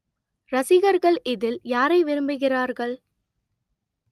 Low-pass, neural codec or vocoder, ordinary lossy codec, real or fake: 14.4 kHz; none; Opus, 32 kbps; real